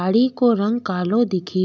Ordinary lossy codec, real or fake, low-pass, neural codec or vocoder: none; real; none; none